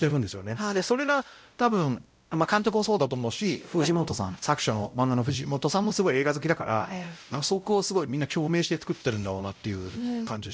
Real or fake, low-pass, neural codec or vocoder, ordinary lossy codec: fake; none; codec, 16 kHz, 0.5 kbps, X-Codec, WavLM features, trained on Multilingual LibriSpeech; none